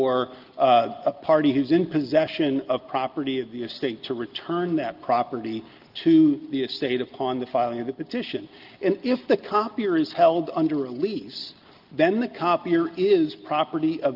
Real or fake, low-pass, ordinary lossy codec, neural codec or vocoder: real; 5.4 kHz; Opus, 32 kbps; none